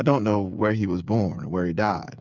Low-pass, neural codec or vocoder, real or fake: 7.2 kHz; codec, 16 kHz, 8 kbps, FreqCodec, smaller model; fake